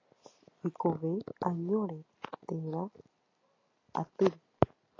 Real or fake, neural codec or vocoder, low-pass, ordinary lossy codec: real; none; 7.2 kHz; AAC, 32 kbps